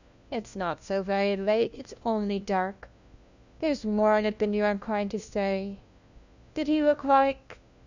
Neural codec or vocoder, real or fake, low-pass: codec, 16 kHz, 1 kbps, FunCodec, trained on LibriTTS, 50 frames a second; fake; 7.2 kHz